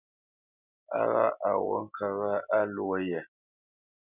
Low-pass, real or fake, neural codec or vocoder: 3.6 kHz; real; none